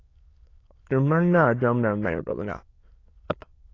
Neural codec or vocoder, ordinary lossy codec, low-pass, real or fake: autoencoder, 22.05 kHz, a latent of 192 numbers a frame, VITS, trained on many speakers; AAC, 32 kbps; 7.2 kHz; fake